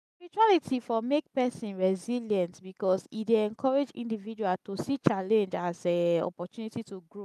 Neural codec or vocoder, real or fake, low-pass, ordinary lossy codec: none; real; 14.4 kHz; none